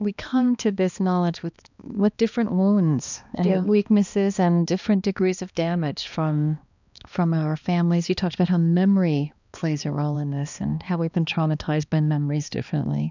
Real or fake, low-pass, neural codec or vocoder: fake; 7.2 kHz; codec, 16 kHz, 2 kbps, X-Codec, HuBERT features, trained on balanced general audio